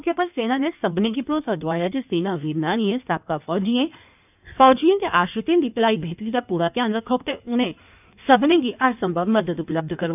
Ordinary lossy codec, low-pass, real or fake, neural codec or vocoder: none; 3.6 kHz; fake; codec, 16 kHz in and 24 kHz out, 1.1 kbps, FireRedTTS-2 codec